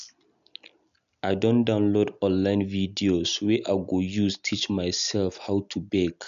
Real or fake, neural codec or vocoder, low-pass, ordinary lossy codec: real; none; 7.2 kHz; AAC, 96 kbps